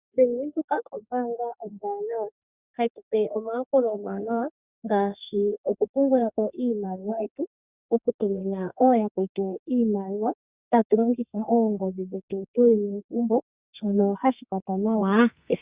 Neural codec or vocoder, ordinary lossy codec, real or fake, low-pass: codec, 32 kHz, 1.9 kbps, SNAC; Opus, 64 kbps; fake; 3.6 kHz